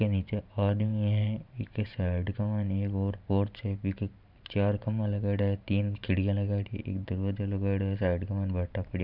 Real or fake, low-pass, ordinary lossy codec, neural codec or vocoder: fake; 5.4 kHz; none; vocoder, 44.1 kHz, 128 mel bands every 512 samples, BigVGAN v2